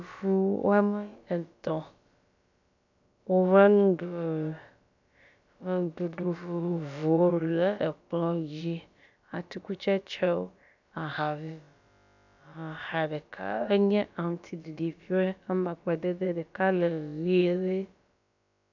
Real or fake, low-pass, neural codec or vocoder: fake; 7.2 kHz; codec, 16 kHz, about 1 kbps, DyCAST, with the encoder's durations